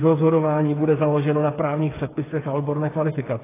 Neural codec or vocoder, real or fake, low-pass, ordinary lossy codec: codec, 16 kHz, 8 kbps, FreqCodec, smaller model; fake; 3.6 kHz; AAC, 16 kbps